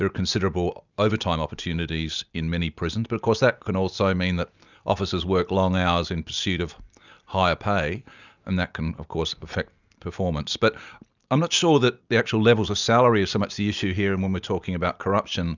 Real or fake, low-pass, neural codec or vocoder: real; 7.2 kHz; none